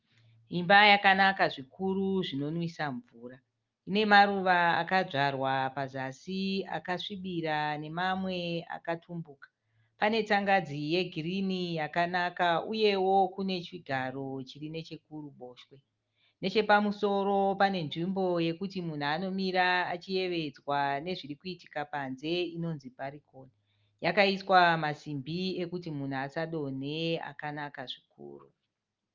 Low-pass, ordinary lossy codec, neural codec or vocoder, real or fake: 7.2 kHz; Opus, 32 kbps; none; real